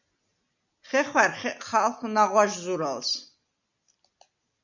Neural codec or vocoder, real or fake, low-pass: none; real; 7.2 kHz